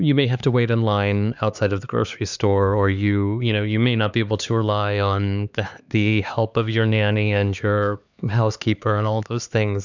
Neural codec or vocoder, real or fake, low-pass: codec, 16 kHz, 4 kbps, X-Codec, HuBERT features, trained on LibriSpeech; fake; 7.2 kHz